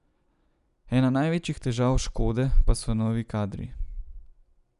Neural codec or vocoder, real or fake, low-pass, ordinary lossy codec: none; real; 10.8 kHz; none